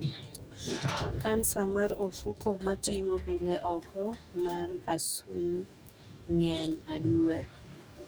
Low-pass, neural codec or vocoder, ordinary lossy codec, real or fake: none; codec, 44.1 kHz, 2.6 kbps, DAC; none; fake